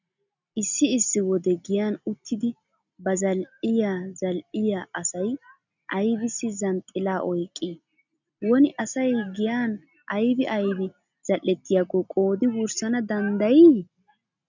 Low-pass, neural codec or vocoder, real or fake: 7.2 kHz; none; real